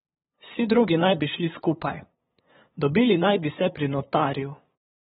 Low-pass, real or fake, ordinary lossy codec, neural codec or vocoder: 7.2 kHz; fake; AAC, 16 kbps; codec, 16 kHz, 8 kbps, FunCodec, trained on LibriTTS, 25 frames a second